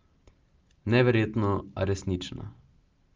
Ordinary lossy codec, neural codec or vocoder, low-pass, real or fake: Opus, 24 kbps; none; 7.2 kHz; real